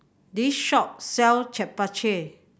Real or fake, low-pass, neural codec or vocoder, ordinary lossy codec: real; none; none; none